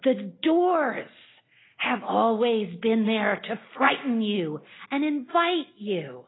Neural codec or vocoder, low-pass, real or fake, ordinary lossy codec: none; 7.2 kHz; real; AAC, 16 kbps